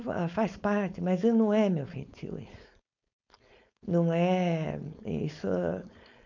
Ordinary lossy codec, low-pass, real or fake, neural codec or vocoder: none; 7.2 kHz; fake; codec, 16 kHz, 4.8 kbps, FACodec